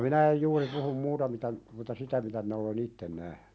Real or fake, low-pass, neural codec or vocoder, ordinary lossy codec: real; none; none; none